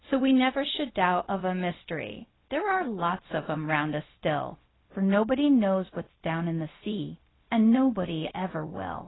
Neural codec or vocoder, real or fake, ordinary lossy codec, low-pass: codec, 16 kHz, 0.4 kbps, LongCat-Audio-Codec; fake; AAC, 16 kbps; 7.2 kHz